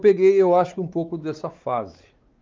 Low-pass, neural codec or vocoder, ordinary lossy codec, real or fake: 7.2 kHz; codec, 16 kHz, 4 kbps, X-Codec, WavLM features, trained on Multilingual LibriSpeech; Opus, 32 kbps; fake